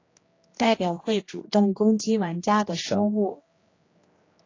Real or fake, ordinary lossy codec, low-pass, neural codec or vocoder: fake; AAC, 32 kbps; 7.2 kHz; codec, 16 kHz, 1 kbps, X-Codec, HuBERT features, trained on general audio